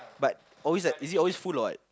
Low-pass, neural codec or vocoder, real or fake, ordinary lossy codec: none; none; real; none